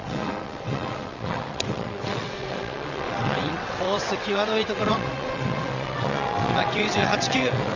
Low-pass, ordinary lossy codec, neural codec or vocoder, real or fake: 7.2 kHz; none; vocoder, 22.05 kHz, 80 mel bands, WaveNeXt; fake